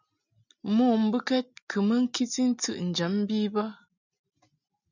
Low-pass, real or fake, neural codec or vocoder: 7.2 kHz; real; none